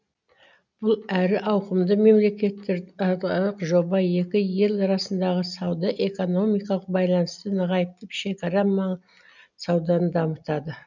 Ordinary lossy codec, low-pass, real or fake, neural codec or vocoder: none; 7.2 kHz; real; none